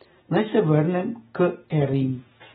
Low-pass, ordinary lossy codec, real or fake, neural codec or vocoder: 7.2 kHz; AAC, 16 kbps; real; none